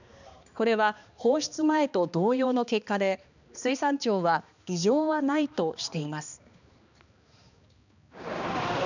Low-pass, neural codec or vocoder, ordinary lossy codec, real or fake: 7.2 kHz; codec, 16 kHz, 2 kbps, X-Codec, HuBERT features, trained on balanced general audio; none; fake